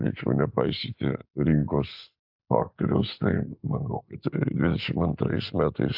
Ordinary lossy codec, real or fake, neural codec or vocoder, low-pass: AAC, 48 kbps; real; none; 5.4 kHz